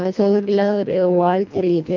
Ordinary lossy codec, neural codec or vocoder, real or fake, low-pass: none; codec, 24 kHz, 1.5 kbps, HILCodec; fake; 7.2 kHz